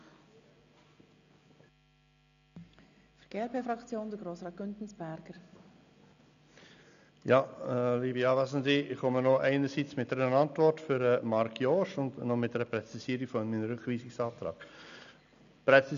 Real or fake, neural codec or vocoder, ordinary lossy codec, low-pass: real; none; none; 7.2 kHz